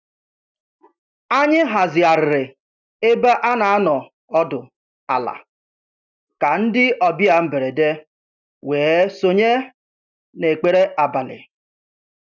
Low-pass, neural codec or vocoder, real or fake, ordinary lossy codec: 7.2 kHz; none; real; none